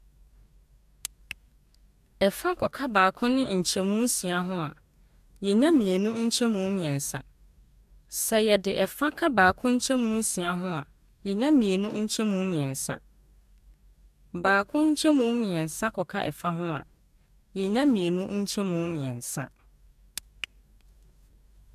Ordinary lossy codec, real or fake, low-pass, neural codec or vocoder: MP3, 96 kbps; fake; 14.4 kHz; codec, 44.1 kHz, 2.6 kbps, DAC